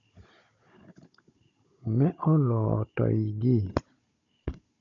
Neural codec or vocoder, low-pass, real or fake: codec, 16 kHz, 16 kbps, FunCodec, trained on Chinese and English, 50 frames a second; 7.2 kHz; fake